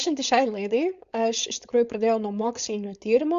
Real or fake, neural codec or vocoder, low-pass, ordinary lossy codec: fake; codec, 16 kHz, 4.8 kbps, FACodec; 7.2 kHz; Opus, 64 kbps